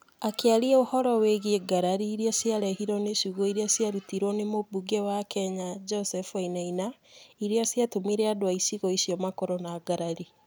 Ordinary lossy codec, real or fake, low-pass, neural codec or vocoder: none; real; none; none